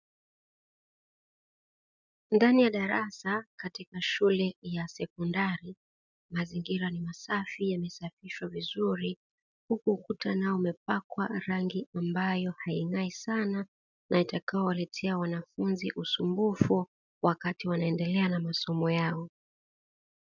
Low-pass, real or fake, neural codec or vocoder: 7.2 kHz; real; none